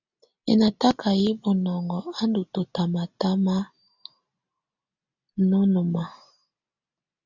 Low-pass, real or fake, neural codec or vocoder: 7.2 kHz; real; none